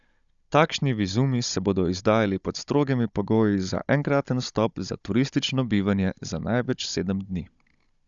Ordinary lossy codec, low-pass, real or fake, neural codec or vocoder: Opus, 64 kbps; 7.2 kHz; fake; codec, 16 kHz, 16 kbps, FunCodec, trained on Chinese and English, 50 frames a second